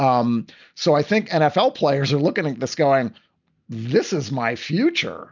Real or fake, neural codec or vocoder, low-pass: real; none; 7.2 kHz